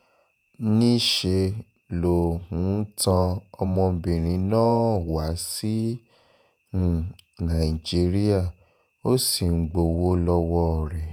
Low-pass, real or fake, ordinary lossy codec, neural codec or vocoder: none; fake; none; vocoder, 48 kHz, 128 mel bands, Vocos